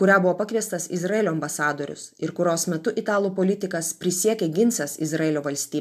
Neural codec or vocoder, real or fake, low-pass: none; real; 14.4 kHz